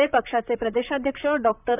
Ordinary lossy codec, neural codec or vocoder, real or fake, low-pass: none; vocoder, 44.1 kHz, 128 mel bands, Pupu-Vocoder; fake; 3.6 kHz